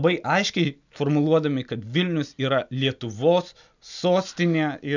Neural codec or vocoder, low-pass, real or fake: none; 7.2 kHz; real